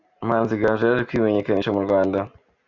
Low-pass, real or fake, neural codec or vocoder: 7.2 kHz; real; none